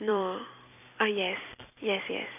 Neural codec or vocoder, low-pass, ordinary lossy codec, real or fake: vocoder, 44.1 kHz, 128 mel bands every 256 samples, BigVGAN v2; 3.6 kHz; none; fake